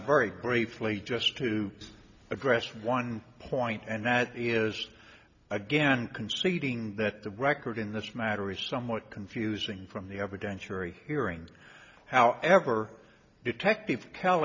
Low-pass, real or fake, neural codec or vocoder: 7.2 kHz; real; none